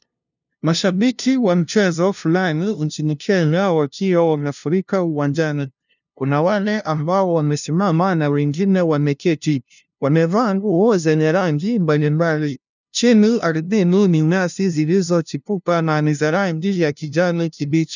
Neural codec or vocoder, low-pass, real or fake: codec, 16 kHz, 0.5 kbps, FunCodec, trained on LibriTTS, 25 frames a second; 7.2 kHz; fake